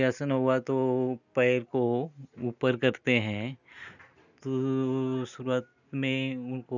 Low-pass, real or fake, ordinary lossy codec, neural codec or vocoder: 7.2 kHz; real; none; none